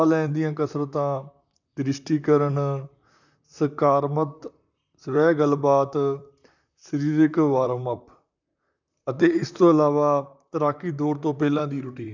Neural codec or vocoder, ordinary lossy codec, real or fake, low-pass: vocoder, 44.1 kHz, 128 mel bands, Pupu-Vocoder; none; fake; 7.2 kHz